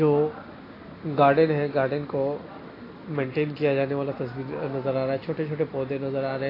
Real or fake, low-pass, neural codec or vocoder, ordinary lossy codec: real; 5.4 kHz; none; AAC, 24 kbps